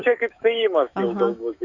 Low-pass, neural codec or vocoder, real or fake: 7.2 kHz; autoencoder, 48 kHz, 128 numbers a frame, DAC-VAE, trained on Japanese speech; fake